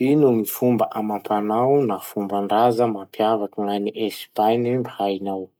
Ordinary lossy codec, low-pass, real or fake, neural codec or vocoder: none; none; real; none